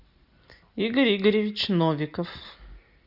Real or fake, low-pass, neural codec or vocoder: real; 5.4 kHz; none